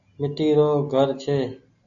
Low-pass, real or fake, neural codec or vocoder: 7.2 kHz; real; none